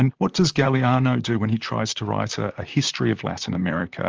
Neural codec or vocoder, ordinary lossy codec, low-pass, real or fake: vocoder, 44.1 kHz, 128 mel bands, Pupu-Vocoder; Opus, 24 kbps; 7.2 kHz; fake